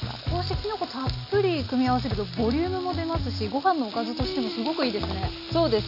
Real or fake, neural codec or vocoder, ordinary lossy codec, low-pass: real; none; none; 5.4 kHz